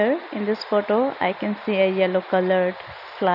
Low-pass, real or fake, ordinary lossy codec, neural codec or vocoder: 5.4 kHz; real; none; none